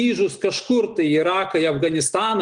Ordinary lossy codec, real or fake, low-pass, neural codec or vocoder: Opus, 24 kbps; real; 9.9 kHz; none